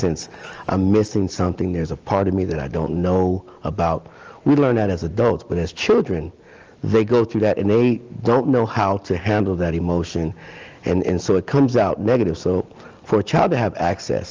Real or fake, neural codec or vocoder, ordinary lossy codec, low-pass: real; none; Opus, 24 kbps; 7.2 kHz